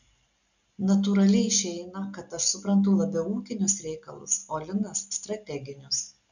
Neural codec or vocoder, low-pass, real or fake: none; 7.2 kHz; real